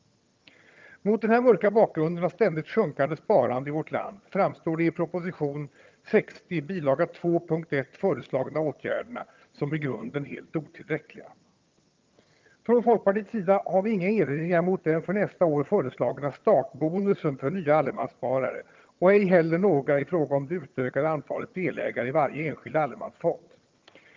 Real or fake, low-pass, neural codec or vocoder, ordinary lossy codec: fake; 7.2 kHz; vocoder, 22.05 kHz, 80 mel bands, HiFi-GAN; Opus, 24 kbps